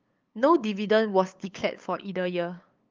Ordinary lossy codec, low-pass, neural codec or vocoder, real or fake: Opus, 32 kbps; 7.2 kHz; codec, 16 kHz, 8 kbps, FunCodec, trained on LibriTTS, 25 frames a second; fake